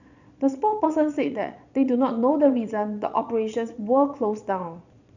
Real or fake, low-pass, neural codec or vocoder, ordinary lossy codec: fake; 7.2 kHz; vocoder, 22.05 kHz, 80 mel bands, Vocos; none